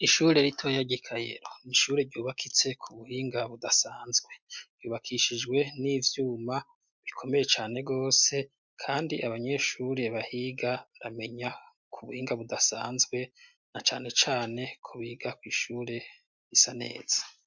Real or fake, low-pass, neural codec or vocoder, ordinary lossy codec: real; 7.2 kHz; none; MP3, 64 kbps